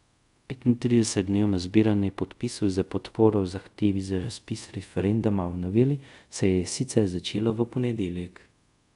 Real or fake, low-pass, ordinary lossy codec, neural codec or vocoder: fake; 10.8 kHz; none; codec, 24 kHz, 0.5 kbps, DualCodec